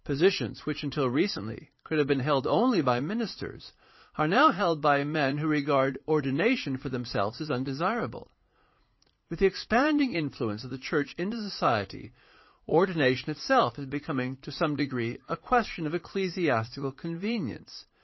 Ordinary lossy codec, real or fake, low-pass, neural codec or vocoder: MP3, 24 kbps; fake; 7.2 kHz; vocoder, 44.1 kHz, 80 mel bands, Vocos